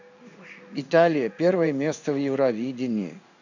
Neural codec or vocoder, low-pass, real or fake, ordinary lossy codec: codec, 16 kHz in and 24 kHz out, 1 kbps, XY-Tokenizer; 7.2 kHz; fake; none